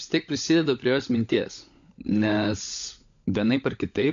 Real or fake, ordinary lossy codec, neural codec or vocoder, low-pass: fake; AAC, 64 kbps; codec, 16 kHz, 16 kbps, FunCodec, trained on LibriTTS, 50 frames a second; 7.2 kHz